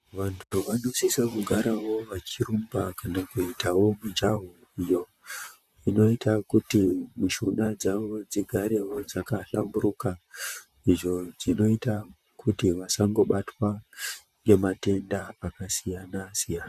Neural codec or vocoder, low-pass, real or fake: vocoder, 44.1 kHz, 128 mel bands, Pupu-Vocoder; 14.4 kHz; fake